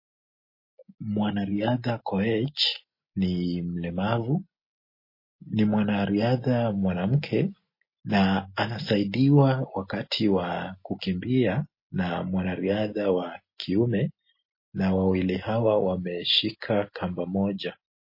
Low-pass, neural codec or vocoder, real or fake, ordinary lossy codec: 5.4 kHz; none; real; MP3, 24 kbps